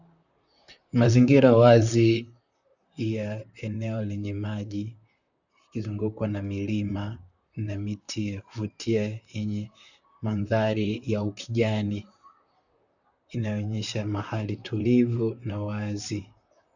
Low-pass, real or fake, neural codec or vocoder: 7.2 kHz; fake; vocoder, 44.1 kHz, 128 mel bands, Pupu-Vocoder